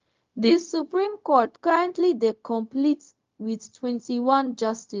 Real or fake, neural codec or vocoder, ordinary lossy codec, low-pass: fake; codec, 16 kHz, 0.4 kbps, LongCat-Audio-Codec; Opus, 32 kbps; 7.2 kHz